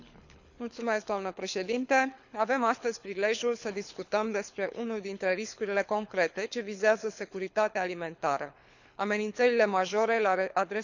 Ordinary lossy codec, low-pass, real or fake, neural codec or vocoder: none; 7.2 kHz; fake; codec, 24 kHz, 6 kbps, HILCodec